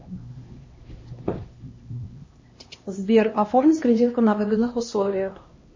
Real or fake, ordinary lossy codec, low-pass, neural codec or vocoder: fake; MP3, 32 kbps; 7.2 kHz; codec, 16 kHz, 1 kbps, X-Codec, HuBERT features, trained on LibriSpeech